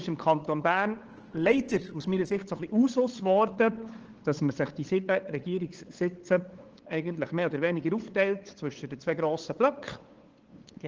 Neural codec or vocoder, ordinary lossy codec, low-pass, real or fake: codec, 16 kHz, 8 kbps, FunCodec, trained on LibriTTS, 25 frames a second; Opus, 16 kbps; 7.2 kHz; fake